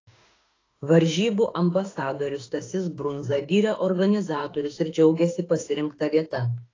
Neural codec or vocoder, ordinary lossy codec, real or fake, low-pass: autoencoder, 48 kHz, 32 numbers a frame, DAC-VAE, trained on Japanese speech; AAC, 32 kbps; fake; 7.2 kHz